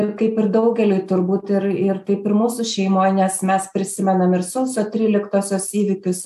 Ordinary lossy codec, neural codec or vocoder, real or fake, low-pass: AAC, 96 kbps; none; real; 14.4 kHz